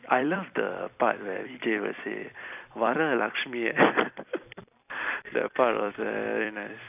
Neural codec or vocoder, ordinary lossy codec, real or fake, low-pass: vocoder, 44.1 kHz, 128 mel bands every 256 samples, BigVGAN v2; none; fake; 3.6 kHz